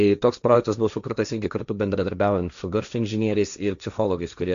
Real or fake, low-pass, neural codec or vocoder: fake; 7.2 kHz; codec, 16 kHz, 1.1 kbps, Voila-Tokenizer